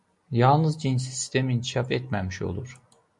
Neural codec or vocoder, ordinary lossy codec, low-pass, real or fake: none; MP3, 48 kbps; 10.8 kHz; real